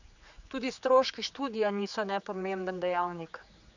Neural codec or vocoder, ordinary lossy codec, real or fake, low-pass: codec, 16 kHz, 4 kbps, X-Codec, HuBERT features, trained on general audio; none; fake; 7.2 kHz